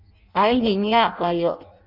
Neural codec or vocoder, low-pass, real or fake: codec, 16 kHz in and 24 kHz out, 0.6 kbps, FireRedTTS-2 codec; 5.4 kHz; fake